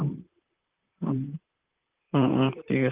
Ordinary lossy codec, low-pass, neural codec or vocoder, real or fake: Opus, 24 kbps; 3.6 kHz; vocoder, 44.1 kHz, 128 mel bands, Pupu-Vocoder; fake